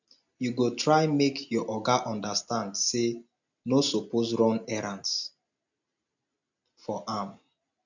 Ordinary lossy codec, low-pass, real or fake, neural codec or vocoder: MP3, 64 kbps; 7.2 kHz; real; none